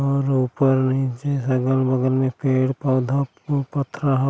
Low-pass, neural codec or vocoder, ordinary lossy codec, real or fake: none; none; none; real